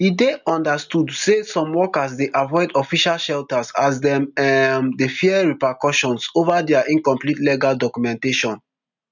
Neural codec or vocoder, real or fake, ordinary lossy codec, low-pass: none; real; none; 7.2 kHz